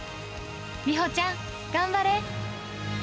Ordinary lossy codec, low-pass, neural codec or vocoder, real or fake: none; none; none; real